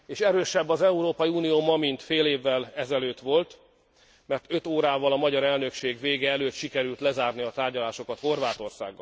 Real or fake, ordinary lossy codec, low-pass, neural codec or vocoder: real; none; none; none